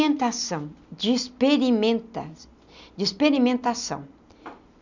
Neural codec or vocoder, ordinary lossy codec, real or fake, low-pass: none; none; real; 7.2 kHz